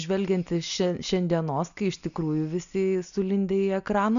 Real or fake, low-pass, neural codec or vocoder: real; 7.2 kHz; none